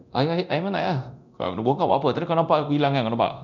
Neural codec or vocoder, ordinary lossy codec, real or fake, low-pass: codec, 24 kHz, 0.9 kbps, DualCodec; none; fake; 7.2 kHz